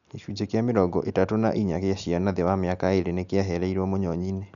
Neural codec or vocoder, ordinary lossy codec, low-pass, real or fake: none; none; 7.2 kHz; real